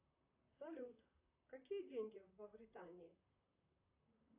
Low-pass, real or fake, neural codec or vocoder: 3.6 kHz; fake; vocoder, 44.1 kHz, 128 mel bands, Pupu-Vocoder